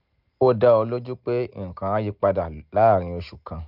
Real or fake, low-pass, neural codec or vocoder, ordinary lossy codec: real; 5.4 kHz; none; none